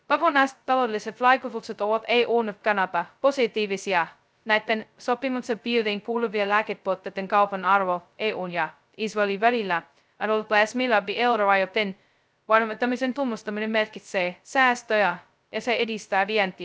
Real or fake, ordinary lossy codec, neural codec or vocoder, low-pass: fake; none; codec, 16 kHz, 0.2 kbps, FocalCodec; none